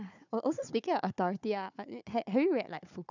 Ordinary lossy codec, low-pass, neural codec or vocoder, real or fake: none; 7.2 kHz; codec, 16 kHz, 4 kbps, FunCodec, trained on Chinese and English, 50 frames a second; fake